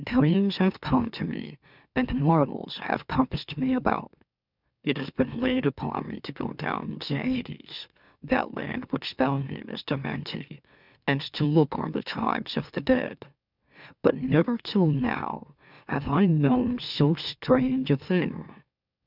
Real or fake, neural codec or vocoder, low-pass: fake; autoencoder, 44.1 kHz, a latent of 192 numbers a frame, MeloTTS; 5.4 kHz